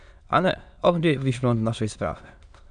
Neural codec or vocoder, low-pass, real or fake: autoencoder, 22.05 kHz, a latent of 192 numbers a frame, VITS, trained on many speakers; 9.9 kHz; fake